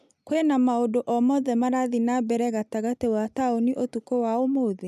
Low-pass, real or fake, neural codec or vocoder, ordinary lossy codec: 14.4 kHz; real; none; none